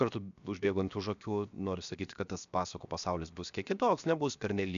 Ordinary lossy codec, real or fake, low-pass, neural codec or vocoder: MP3, 96 kbps; fake; 7.2 kHz; codec, 16 kHz, 0.7 kbps, FocalCodec